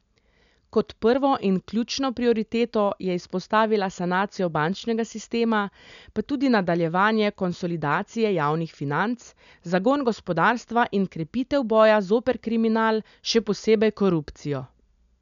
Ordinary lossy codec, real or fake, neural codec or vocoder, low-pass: none; real; none; 7.2 kHz